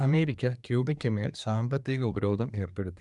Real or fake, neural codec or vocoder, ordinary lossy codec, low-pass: fake; codec, 24 kHz, 1 kbps, SNAC; none; 10.8 kHz